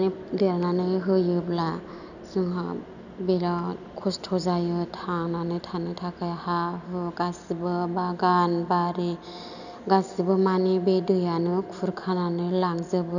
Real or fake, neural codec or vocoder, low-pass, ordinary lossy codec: real; none; 7.2 kHz; none